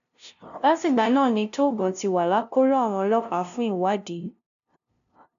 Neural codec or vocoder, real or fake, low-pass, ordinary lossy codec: codec, 16 kHz, 0.5 kbps, FunCodec, trained on LibriTTS, 25 frames a second; fake; 7.2 kHz; none